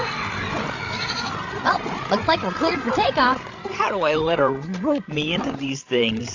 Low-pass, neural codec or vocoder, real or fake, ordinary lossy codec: 7.2 kHz; codec, 16 kHz, 16 kbps, FreqCodec, larger model; fake; AAC, 48 kbps